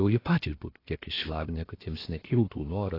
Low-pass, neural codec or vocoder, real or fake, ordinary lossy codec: 5.4 kHz; codec, 16 kHz, 0.7 kbps, FocalCodec; fake; AAC, 24 kbps